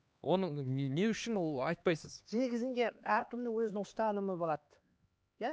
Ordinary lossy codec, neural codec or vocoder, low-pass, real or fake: none; codec, 16 kHz, 2 kbps, X-Codec, HuBERT features, trained on LibriSpeech; none; fake